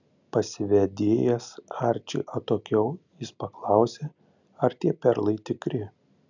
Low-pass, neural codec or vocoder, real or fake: 7.2 kHz; none; real